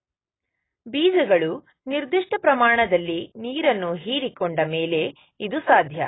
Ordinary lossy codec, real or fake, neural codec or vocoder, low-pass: AAC, 16 kbps; real; none; 7.2 kHz